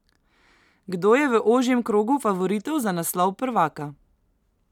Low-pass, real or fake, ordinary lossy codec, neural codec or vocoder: 19.8 kHz; real; none; none